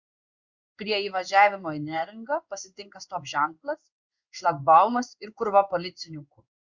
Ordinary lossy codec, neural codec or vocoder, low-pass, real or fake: Opus, 64 kbps; codec, 16 kHz in and 24 kHz out, 1 kbps, XY-Tokenizer; 7.2 kHz; fake